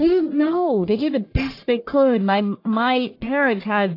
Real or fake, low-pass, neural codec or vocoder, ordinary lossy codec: fake; 5.4 kHz; codec, 44.1 kHz, 1.7 kbps, Pupu-Codec; AAC, 32 kbps